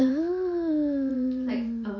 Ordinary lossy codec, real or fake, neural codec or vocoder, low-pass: MP3, 48 kbps; fake; vocoder, 44.1 kHz, 128 mel bands every 512 samples, BigVGAN v2; 7.2 kHz